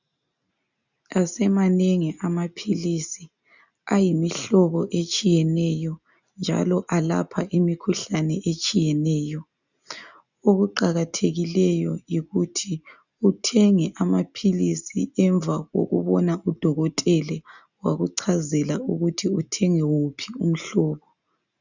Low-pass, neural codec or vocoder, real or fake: 7.2 kHz; none; real